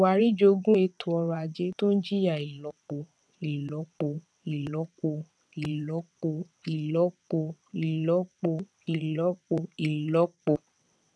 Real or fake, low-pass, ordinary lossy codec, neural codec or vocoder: fake; none; none; vocoder, 22.05 kHz, 80 mel bands, WaveNeXt